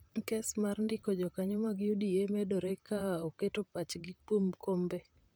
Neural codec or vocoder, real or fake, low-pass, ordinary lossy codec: vocoder, 44.1 kHz, 128 mel bands, Pupu-Vocoder; fake; none; none